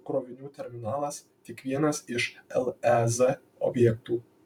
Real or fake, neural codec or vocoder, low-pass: fake; vocoder, 44.1 kHz, 128 mel bands every 512 samples, BigVGAN v2; 19.8 kHz